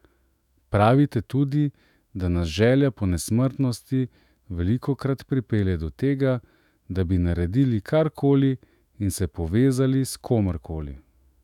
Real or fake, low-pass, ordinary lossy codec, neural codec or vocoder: fake; 19.8 kHz; none; autoencoder, 48 kHz, 128 numbers a frame, DAC-VAE, trained on Japanese speech